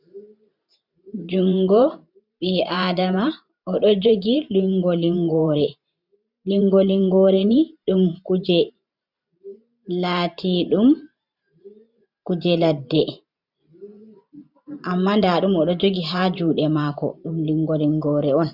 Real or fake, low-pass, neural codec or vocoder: fake; 5.4 kHz; vocoder, 44.1 kHz, 128 mel bands every 512 samples, BigVGAN v2